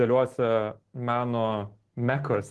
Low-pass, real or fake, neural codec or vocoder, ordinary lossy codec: 10.8 kHz; real; none; Opus, 16 kbps